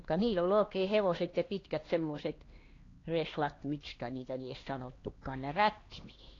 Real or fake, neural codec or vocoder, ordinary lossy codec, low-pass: fake; codec, 16 kHz, 2 kbps, X-Codec, HuBERT features, trained on LibriSpeech; AAC, 32 kbps; 7.2 kHz